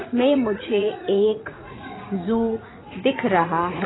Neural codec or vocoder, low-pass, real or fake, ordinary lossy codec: vocoder, 44.1 kHz, 80 mel bands, Vocos; 7.2 kHz; fake; AAC, 16 kbps